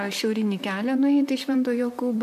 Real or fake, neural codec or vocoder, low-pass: fake; vocoder, 44.1 kHz, 128 mel bands, Pupu-Vocoder; 14.4 kHz